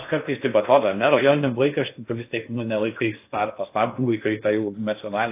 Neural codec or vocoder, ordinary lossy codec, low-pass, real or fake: codec, 16 kHz in and 24 kHz out, 0.6 kbps, FocalCodec, streaming, 4096 codes; AAC, 24 kbps; 3.6 kHz; fake